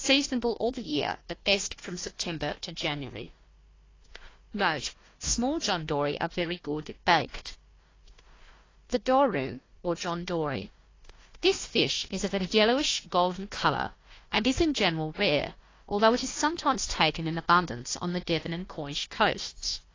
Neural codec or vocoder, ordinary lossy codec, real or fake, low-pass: codec, 16 kHz, 1 kbps, FunCodec, trained on Chinese and English, 50 frames a second; AAC, 32 kbps; fake; 7.2 kHz